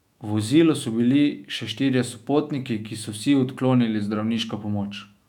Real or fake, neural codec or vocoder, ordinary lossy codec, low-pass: fake; autoencoder, 48 kHz, 128 numbers a frame, DAC-VAE, trained on Japanese speech; none; 19.8 kHz